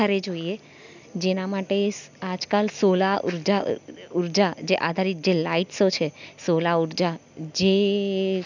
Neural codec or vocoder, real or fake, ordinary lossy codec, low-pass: none; real; none; 7.2 kHz